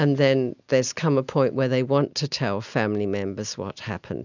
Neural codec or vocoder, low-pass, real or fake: none; 7.2 kHz; real